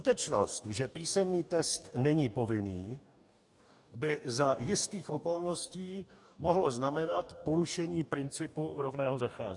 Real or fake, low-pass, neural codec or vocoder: fake; 10.8 kHz; codec, 44.1 kHz, 2.6 kbps, DAC